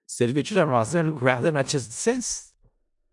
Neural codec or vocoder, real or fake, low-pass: codec, 16 kHz in and 24 kHz out, 0.4 kbps, LongCat-Audio-Codec, four codebook decoder; fake; 10.8 kHz